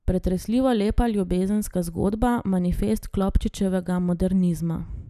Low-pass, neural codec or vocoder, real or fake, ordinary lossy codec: 14.4 kHz; none; real; none